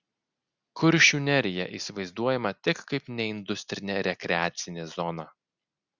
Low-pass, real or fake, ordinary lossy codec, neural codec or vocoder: 7.2 kHz; real; Opus, 64 kbps; none